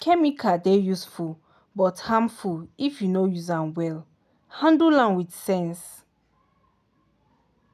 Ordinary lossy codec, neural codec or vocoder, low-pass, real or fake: none; none; 14.4 kHz; real